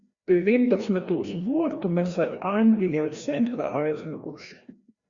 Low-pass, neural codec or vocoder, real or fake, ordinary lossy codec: 7.2 kHz; codec, 16 kHz, 1 kbps, FreqCodec, larger model; fake; Opus, 64 kbps